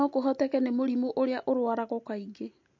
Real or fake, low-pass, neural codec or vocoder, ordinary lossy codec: real; 7.2 kHz; none; MP3, 48 kbps